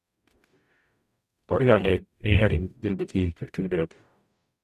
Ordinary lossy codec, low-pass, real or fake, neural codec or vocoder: none; 14.4 kHz; fake; codec, 44.1 kHz, 0.9 kbps, DAC